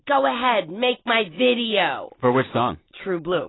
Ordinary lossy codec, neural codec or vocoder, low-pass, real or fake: AAC, 16 kbps; none; 7.2 kHz; real